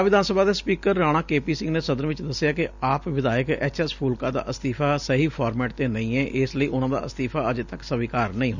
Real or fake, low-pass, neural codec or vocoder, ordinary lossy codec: real; 7.2 kHz; none; none